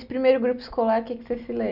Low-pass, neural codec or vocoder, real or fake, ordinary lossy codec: 5.4 kHz; none; real; none